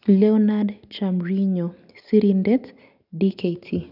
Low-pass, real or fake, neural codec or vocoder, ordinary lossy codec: 5.4 kHz; real; none; none